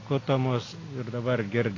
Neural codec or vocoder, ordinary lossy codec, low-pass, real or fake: none; AAC, 32 kbps; 7.2 kHz; real